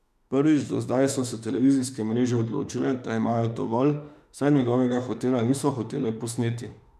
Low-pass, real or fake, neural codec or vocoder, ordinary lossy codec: 14.4 kHz; fake; autoencoder, 48 kHz, 32 numbers a frame, DAC-VAE, trained on Japanese speech; none